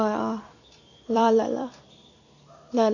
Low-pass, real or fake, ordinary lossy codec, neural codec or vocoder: 7.2 kHz; fake; none; vocoder, 44.1 kHz, 128 mel bands every 256 samples, BigVGAN v2